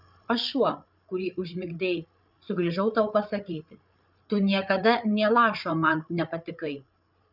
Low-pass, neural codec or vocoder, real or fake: 5.4 kHz; codec, 16 kHz, 8 kbps, FreqCodec, larger model; fake